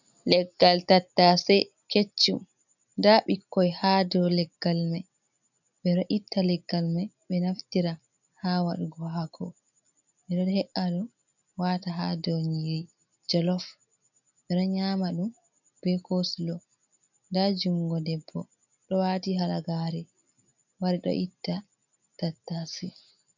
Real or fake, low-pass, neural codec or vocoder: real; 7.2 kHz; none